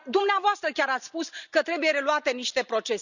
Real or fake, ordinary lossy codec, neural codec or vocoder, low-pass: real; none; none; 7.2 kHz